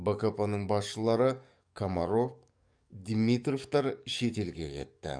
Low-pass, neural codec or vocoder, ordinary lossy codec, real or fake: 9.9 kHz; codec, 44.1 kHz, 7.8 kbps, DAC; none; fake